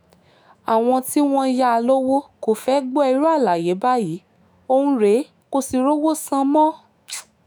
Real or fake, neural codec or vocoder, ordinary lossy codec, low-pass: fake; autoencoder, 48 kHz, 128 numbers a frame, DAC-VAE, trained on Japanese speech; none; none